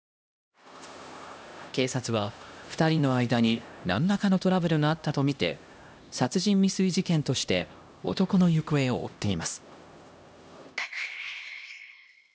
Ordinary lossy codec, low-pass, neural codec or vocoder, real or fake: none; none; codec, 16 kHz, 1 kbps, X-Codec, HuBERT features, trained on LibriSpeech; fake